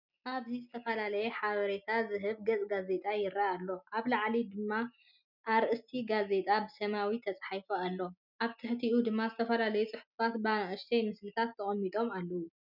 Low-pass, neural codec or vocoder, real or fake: 5.4 kHz; none; real